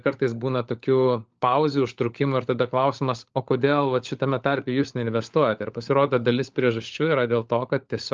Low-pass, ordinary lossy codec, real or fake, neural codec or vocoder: 7.2 kHz; Opus, 24 kbps; fake; codec, 16 kHz, 16 kbps, FunCodec, trained on LibriTTS, 50 frames a second